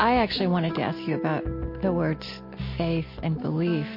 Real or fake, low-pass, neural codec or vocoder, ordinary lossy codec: real; 5.4 kHz; none; MP3, 32 kbps